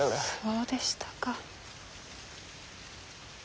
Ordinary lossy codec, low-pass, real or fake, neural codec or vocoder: none; none; real; none